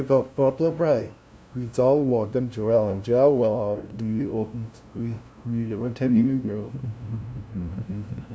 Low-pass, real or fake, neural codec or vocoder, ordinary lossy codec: none; fake; codec, 16 kHz, 0.5 kbps, FunCodec, trained on LibriTTS, 25 frames a second; none